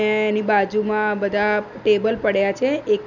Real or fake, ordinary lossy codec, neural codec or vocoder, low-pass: real; none; none; 7.2 kHz